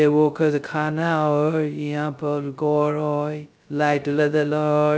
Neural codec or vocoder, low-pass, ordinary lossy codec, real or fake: codec, 16 kHz, 0.2 kbps, FocalCodec; none; none; fake